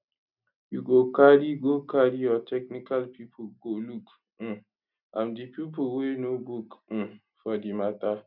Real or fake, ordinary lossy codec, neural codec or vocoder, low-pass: real; none; none; 5.4 kHz